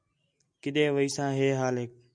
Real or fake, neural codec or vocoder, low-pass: real; none; 9.9 kHz